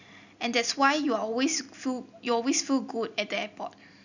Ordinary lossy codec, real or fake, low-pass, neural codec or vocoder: none; real; 7.2 kHz; none